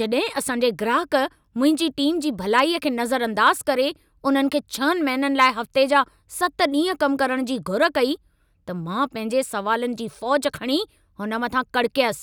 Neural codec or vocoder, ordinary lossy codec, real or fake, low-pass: none; none; real; 19.8 kHz